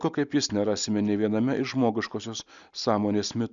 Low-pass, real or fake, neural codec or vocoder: 7.2 kHz; real; none